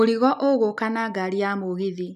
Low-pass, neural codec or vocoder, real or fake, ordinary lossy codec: 14.4 kHz; none; real; none